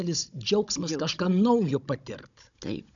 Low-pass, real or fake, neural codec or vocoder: 7.2 kHz; fake; codec, 16 kHz, 16 kbps, FunCodec, trained on Chinese and English, 50 frames a second